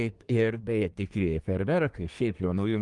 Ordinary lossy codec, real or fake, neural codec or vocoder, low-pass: Opus, 32 kbps; fake; codec, 24 kHz, 1 kbps, SNAC; 10.8 kHz